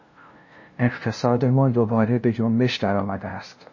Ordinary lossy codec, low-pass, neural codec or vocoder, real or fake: MP3, 32 kbps; 7.2 kHz; codec, 16 kHz, 0.5 kbps, FunCodec, trained on LibriTTS, 25 frames a second; fake